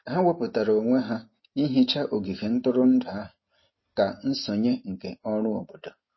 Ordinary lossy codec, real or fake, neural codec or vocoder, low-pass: MP3, 24 kbps; real; none; 7.2 kHz